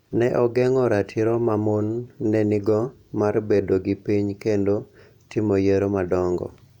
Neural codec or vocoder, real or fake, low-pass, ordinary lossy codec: vocoder, 48 kHz, 128 mel bands, Vocos; fake; 19.8 kHz; none